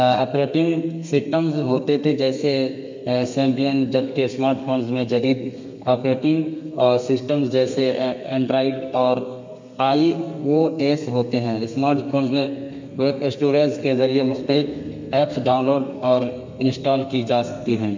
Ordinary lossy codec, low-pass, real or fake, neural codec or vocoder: MP3, 64 kbps; 7.2 kHz; fake; codec, 32 kHz, 1.9 kbps, SNAC